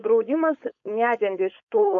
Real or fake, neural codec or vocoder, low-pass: fake; codec, 16 kHz, 4.8 kbps, FACodec; 7.2 kHz